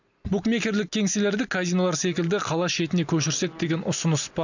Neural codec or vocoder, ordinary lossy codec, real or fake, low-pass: none; none; real; 7.2 kHz